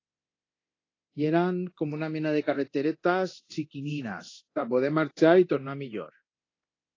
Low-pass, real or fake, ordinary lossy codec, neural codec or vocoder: 7.2 kHz; fake; AAC, 32 kbps; codec, 24 kHz, 0.9 kbps, DualCodec